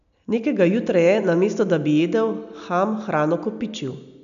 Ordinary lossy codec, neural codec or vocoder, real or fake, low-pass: none; none; real; 7.2 kHz